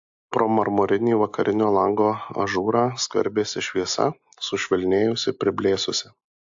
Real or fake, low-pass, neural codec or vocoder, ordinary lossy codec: real; 7.2 kHz; none; AAC, 64 kbps